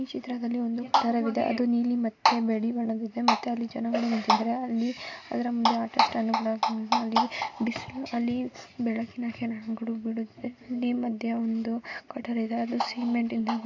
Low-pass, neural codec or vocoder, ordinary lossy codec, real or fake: 7.2 kHz; none; AAC, 48 kbps; real